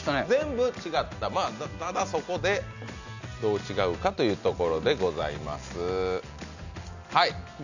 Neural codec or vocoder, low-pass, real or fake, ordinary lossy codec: none; 7.2 kHz; real; none